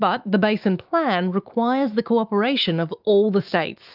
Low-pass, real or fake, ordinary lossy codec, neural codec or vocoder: 5.4 kHz; fake; Opus, 24 kbps; autoencoder, 48 kHz, 128 numbers a frame, DAC-VAE, trained on Japanese speech